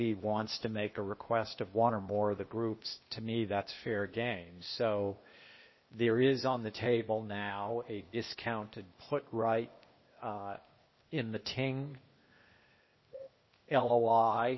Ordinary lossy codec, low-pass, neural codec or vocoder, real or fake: MP3, 24 kbps; 7.2 kHz; codec, 16 kHz, 0.8 kbps, ZipCodec; fake